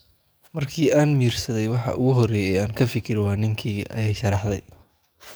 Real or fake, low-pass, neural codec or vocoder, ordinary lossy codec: fake; none; codec, 44.1 kHz, 7.8 kbps, DAC; none